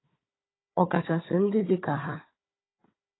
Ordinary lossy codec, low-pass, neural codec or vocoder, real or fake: AAC, 16 kbps; 7.2 kHz; codec, 16 kHz, 4 kbps, FunCodec, trained on Chinese and English, 50 frames a second; fake